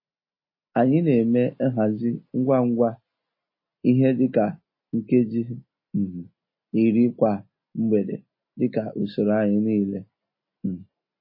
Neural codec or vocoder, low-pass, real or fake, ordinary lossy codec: none; 5.4 kHz; real; MP3, 24 kbps